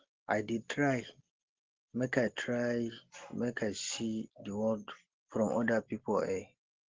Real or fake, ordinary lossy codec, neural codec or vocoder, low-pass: real; Opus, 16 kbps; none; 7.2 kHz